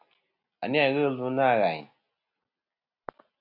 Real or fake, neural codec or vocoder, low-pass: real; none; 5.4 kHz